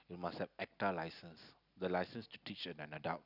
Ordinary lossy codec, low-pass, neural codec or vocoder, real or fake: none; 5.4 kHz; none; real